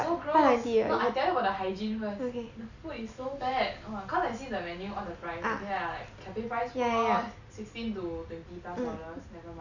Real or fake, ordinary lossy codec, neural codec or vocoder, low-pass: real; none; none; 7.2 kHz